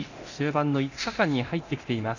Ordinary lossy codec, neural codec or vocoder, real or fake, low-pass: none; codec, 24 kHz, 0.9 kbps, DualCodec; fake; 7.2 kHz